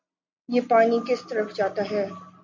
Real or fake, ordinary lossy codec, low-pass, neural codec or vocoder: real; MP3, 64 kbps; 7.2 kHz; none